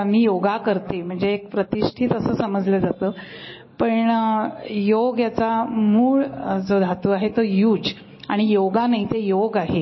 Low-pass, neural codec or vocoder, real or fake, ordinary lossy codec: 7.2 kHz; none; real; MP3, 24 kbps